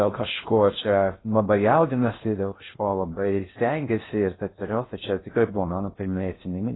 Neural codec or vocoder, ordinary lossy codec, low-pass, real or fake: codec, 16 kHz in and 24 kHz out, 0.6 kbps, FocalCodec, streaming, 2048 codes; AAC, 16 kbps; 7.2 kHz; fake